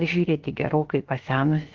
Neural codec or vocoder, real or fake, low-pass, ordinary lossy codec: codec, 16 kHz, 0.7 kbps, FocalCodec; fake; 7.2 kHz; Opus, 16 kbps